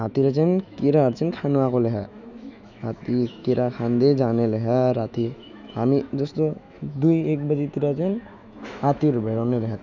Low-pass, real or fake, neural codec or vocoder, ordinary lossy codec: 7.2 kHz; fake; autoencoder, 48 kHz, 128 numbers a frame, DAC-VAE, trained on Japanese speech; none